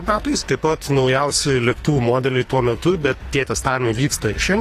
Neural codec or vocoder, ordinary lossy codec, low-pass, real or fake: codec, 44.1 kHz, 2.6 kbps, SNAC; AAC, 48 kbps; 14.4 kHz; fake